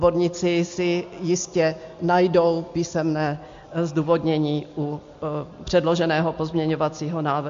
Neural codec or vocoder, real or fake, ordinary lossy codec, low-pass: none; real; MP3, 48 kbps; 7.2 kHz